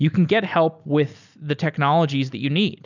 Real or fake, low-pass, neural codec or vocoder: real; 7.2 kHz; none